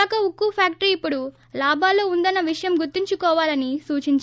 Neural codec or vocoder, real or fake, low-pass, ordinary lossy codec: none; real; none; none